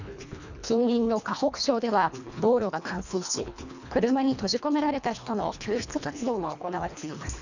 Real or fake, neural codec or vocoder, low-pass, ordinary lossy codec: fake; codec, 24 kHz, 1.5 kbps, HILCodec; 7.2 kHz; none